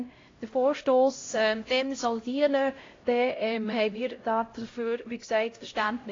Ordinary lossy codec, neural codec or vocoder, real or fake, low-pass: AAC, 32 kbps; codec, 16 kHz, 0.5 kbps, X-Codec, HuBERT features, trained on LibriSpeech; fake; 7.2 kHz